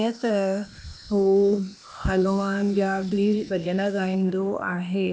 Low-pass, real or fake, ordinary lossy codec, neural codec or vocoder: none; fake; none; codec, 16 kHz, 0.8 kbps, ZipCodec